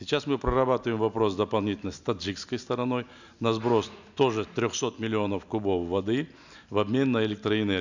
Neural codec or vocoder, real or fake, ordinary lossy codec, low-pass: none; real; none; 7.2 kHz